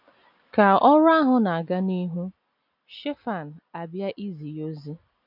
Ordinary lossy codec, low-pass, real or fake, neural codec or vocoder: none; 5.4 kHz; real; none